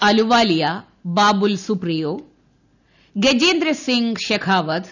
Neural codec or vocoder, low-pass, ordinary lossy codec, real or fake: none; 7.2 kHz; none; real